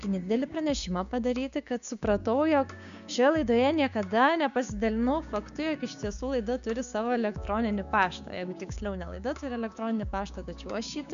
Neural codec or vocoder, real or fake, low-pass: codec, 16 kHz, 6 kbps, DAC; fake; 7.2 kHz